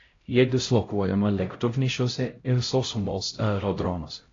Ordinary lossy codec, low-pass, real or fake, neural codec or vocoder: AAC, 32 kbps; 7.2 kHz; fake; codec, 16 kHz, 0.5 kbps, X-Codec, HuBERT features, trained on LibriSpeech